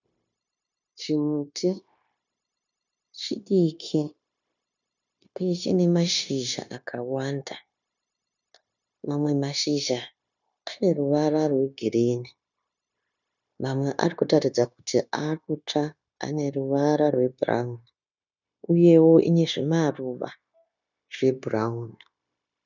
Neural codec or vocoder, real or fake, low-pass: codec, 16 kHz, 0.9 kbps, LongCat-Audio-Codec; fake; 7.2 kHz